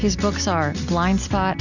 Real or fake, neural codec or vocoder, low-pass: real; none; 7.2 kHz